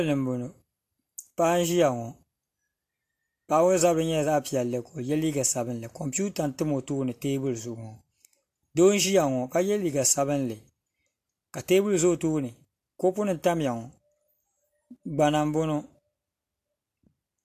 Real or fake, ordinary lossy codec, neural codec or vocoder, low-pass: real; AAC, 64 kbps; none; 14.4 kHz